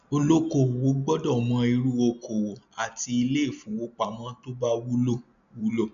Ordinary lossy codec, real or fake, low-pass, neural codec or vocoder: none; real; 7.2 kHz; none